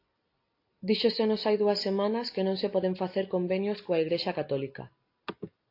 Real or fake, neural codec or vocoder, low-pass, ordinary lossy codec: real; none; 5.4 kHz; AAC, 32 kbps